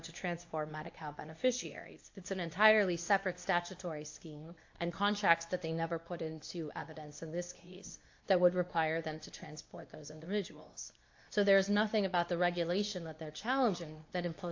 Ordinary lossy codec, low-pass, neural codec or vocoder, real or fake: AAC, 48 kbps; 7.2 kHz; codec, 24 kHz, 0.9 kbps, WavTokenizer, medium speech release version 2; fake